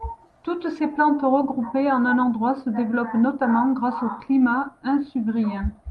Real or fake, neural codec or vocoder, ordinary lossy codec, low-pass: real; none; Opus, 32 kbps; 10.8 kHz